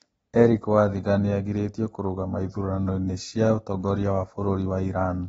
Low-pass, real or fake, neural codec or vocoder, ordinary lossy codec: 19.8 kHz; real; none; AAC, 24 kbps